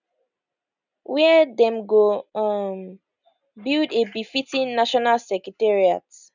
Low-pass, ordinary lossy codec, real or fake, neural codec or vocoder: 7.2 kHz; none; real; none